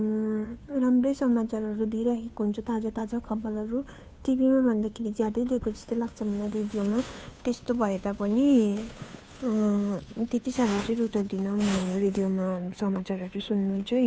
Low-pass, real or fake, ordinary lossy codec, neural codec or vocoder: none; fake; none; codec, 16 kHz, 2 kbps, FunCodec, trained on Chinese and English, 25 frames a second